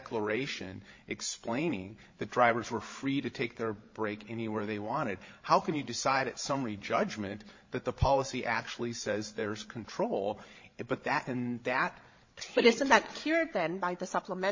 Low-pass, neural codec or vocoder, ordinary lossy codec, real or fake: 7.2 kHz; codec, 16 kHz, 16 kbps, FreqCodec, larger model; MP3, 32 kbps; fake